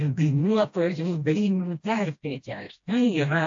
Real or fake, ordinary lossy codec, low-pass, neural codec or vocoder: fake; AAC, 64 kbps; 7.2 kHz; codec, 16 kHz, 1 kbps, FreqCodec, smaller model